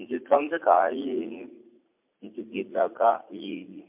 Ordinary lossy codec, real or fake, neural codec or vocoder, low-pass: MP3, 32 kbps; fake; vocoder, 44.1 kHz, 80 mel bands, Vocos; 3.6 kHz